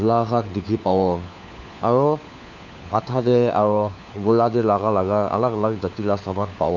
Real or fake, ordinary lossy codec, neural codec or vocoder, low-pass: fake; none; codec, 16 kHz, 4 kbps, FunCodec, trained on LibriTTS, 50 frames a second; 7.2 kHz